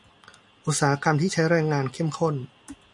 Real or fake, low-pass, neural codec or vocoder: real; 10.8 kHz; none